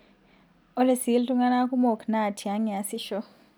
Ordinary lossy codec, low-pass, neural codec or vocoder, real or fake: none; none; none; real